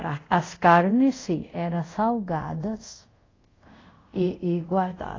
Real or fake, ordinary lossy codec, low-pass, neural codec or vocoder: fake; AAC, 32 kbps; 7.2 kHz; codec, 24 kHz, 0.5 kbps, DualCodec